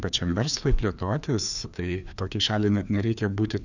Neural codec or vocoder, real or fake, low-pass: codec, 16 kHz, 2 kbps, FreqCodec, larger model; fake; 7.2 kHz